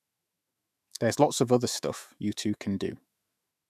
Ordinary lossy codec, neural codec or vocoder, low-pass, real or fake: none; autoencoder, 48 kHz, 128 numbers a frame, DAC-VAE, trained on Japanese speech; 14.4 kHz; fake